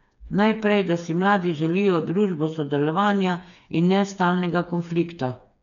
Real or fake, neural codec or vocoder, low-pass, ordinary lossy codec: fake; codec, 16 kHz, 4 kbps, FreqCodec, smaller model; 7.2 kHz; none